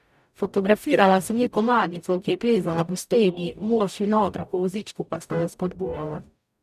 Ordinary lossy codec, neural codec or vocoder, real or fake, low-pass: none; codec, 44.1 kHz, 0.9 kbps, DAC; fake; 14.4 kHz